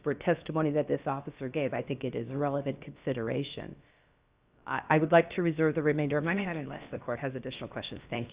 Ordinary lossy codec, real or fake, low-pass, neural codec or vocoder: Opus, 64 kbps; fake; 3.6 kHz; codec, 16 kHz, about 1 kbps, DyCAST, with the encoder's durations